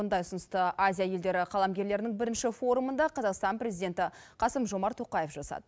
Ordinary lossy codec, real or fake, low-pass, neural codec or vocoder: none; real; none; none